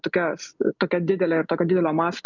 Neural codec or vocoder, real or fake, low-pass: none; real; 7.2 kHz